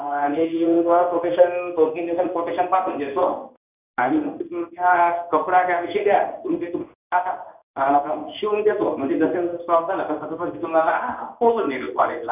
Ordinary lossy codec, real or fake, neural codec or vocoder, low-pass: none; fake; codec, 16 kHz in and 24 kHz out, 1 kbps, XY-Tokenizer; 3.6 kHz